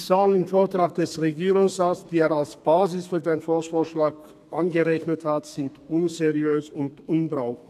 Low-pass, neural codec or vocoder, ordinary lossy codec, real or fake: 14.4 kHz; codec, 32 kHz, 1.9 kbps, SNAC; none; fake